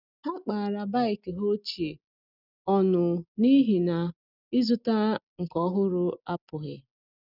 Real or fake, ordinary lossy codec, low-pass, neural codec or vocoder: real; none; 5.4 kHz; none